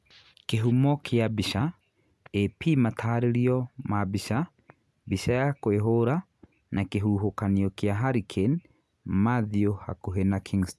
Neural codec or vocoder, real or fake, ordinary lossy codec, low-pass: none; real; none; none